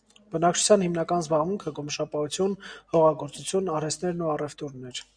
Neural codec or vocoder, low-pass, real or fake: none; 9.9 kHz; real